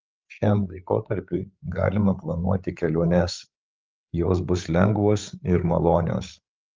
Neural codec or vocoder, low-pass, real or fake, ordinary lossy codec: codec, 16 kHz, 8 kbps, FreqCodec, larger model; 7.2 kHz; fake; Opus, 32 kbps